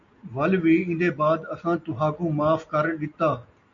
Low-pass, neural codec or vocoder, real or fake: 7.2 kHz; none; real